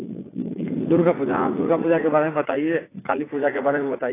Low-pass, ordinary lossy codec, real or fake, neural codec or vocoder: 3.6 kHz; AAC, 16 kbps; fake; vocoder, 44.1 kHz, 80 mel bands, Vocos